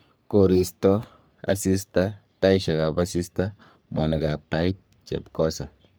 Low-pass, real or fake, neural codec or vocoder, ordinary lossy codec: none; fake; codec, 44.1 kHz, 3.4 kbps, Pupu-Codec; none